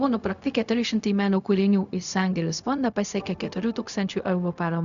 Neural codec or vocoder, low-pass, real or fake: codec, 16 kHz, 0.4 kbps, LongCat-Audio-Codec; 7.2 kHz; fake